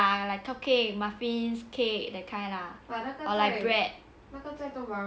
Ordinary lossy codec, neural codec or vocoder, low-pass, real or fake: none; none; none; real